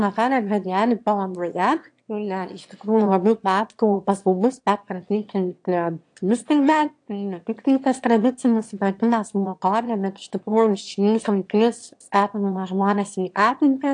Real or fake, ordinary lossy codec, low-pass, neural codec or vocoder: fake; AAC, 64 kbps; 9.9 kHz; autoencoder, 22.05 kHz, a latent of 192 numbers a frame, VITS, trained on one speaker